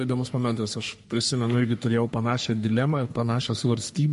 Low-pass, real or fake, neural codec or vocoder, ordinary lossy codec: 14.4 kHz; fake; codec, 44.1 kHz, 3.4 kbps, Pupu-Codec; MP3, 48 kbps